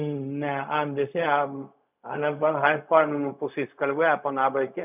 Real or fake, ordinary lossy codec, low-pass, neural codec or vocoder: fake; none; 3.6 kHz; codec, 16 kHz, 0.4 kbps, LongCat-Audio-Codec